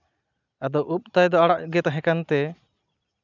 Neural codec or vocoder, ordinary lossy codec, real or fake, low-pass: none; none; real; 7.2 kHz